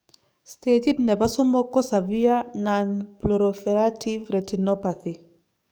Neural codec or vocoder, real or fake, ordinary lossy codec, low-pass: codec, 44.1 kHz, 7.8 kbps, DAC; fake; none; none